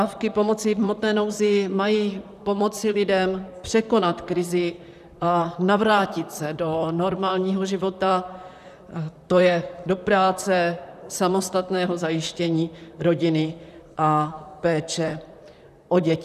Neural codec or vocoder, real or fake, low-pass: vocoder, 44.1 kHz, 128 mel bands, Pupu-Vocoder; fake; 14.4 kHz